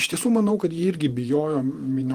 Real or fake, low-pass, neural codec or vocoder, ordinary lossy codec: fake; 14.4 kHz; vocoder, 48 kHz, 128 mel bands, Vocos; Opus, 24 kbps